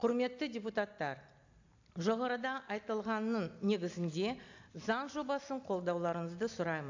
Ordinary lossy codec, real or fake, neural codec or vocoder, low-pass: AAC, 48 kbps; real; none; 7.2 kHz